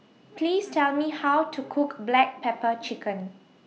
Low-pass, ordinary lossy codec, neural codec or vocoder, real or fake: none; none; none; real